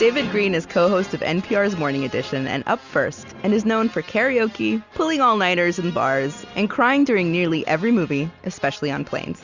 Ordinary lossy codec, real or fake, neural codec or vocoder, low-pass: Opus, 64 kbps; real; none; 7.2 kHz